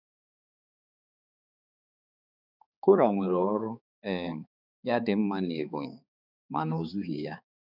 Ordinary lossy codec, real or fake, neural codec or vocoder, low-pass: none; fake; codec, 16 kHz, 4 kbps, X-Codec, HuBERT features, trained on balanced general audio; 5.4 kHz